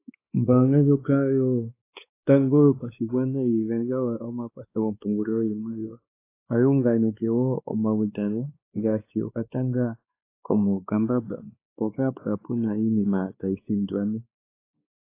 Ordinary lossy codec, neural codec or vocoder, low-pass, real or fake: AAC, 24 kbps; codec, 16 kHz, 2 kbps, X-Codec, WavLM features, trained on Multilingual LibriSpeech; 3.6 kHz; fake